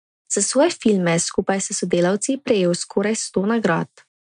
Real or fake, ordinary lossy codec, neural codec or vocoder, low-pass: real; none; none; 9.9 kHz